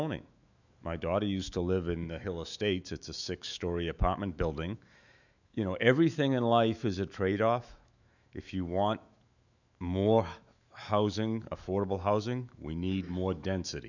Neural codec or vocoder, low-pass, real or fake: autoencoder, 48 kHz, 128 numbers a frame, DAC-VAE, trained on Japanese speech; 7.2 kHz; fake